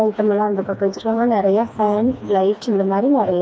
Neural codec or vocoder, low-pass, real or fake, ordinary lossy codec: codec, 16 kHz, 2 kbps, FreqCodec, smaller model; none; fake; none